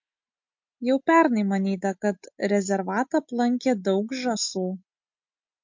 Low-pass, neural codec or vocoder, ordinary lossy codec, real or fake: 7.2 kHz; none; MP3, 48 kbps; real